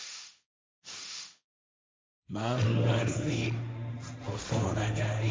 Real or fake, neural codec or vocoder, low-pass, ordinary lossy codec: fake; codec, 16 kHz, 1.1 kbps, Voila-Tokenizer; none; none